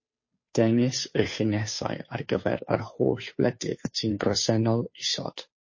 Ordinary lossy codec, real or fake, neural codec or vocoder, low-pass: MP3, 32 kbps; fake; codec, 16 kHz, 2 kbps, FunCodec, trained on Chinese and English, 25 frames a second; 7.2 kHz